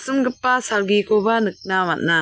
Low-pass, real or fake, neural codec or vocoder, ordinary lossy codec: none; real; none; none